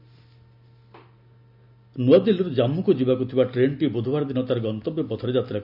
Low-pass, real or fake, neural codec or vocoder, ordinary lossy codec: 5.4 kHz; real; none; none